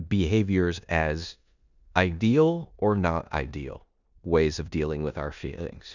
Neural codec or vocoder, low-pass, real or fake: codec, 16 kHz in and 24 kHz out, 0.9 kbps, LongCat-Audio-Codec, four codebook decoder; 7.2 kHz; fake